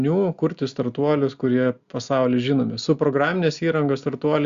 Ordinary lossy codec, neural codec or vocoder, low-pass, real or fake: Opus, 64 kbps; none; 7.2 kHz; real